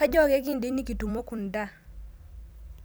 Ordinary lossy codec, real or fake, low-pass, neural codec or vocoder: none; fake; none; vocoder, 44.1 kHz, 128 mel bands every 256 samples, BigVGAN v2